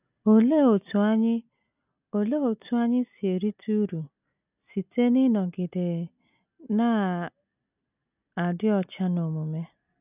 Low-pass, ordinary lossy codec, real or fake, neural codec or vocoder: 3.6 kHz; none; real; none